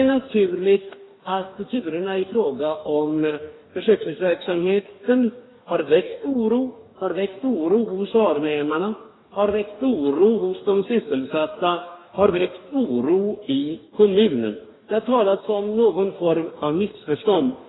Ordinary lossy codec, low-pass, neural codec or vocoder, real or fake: AAC, 16 kbps; 7.2 kHz; codec, 44.1 kHz, 2.6 kbps, DAC; fake